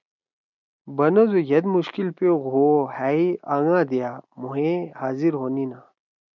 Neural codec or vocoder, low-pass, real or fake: none; 7.2 kHz; real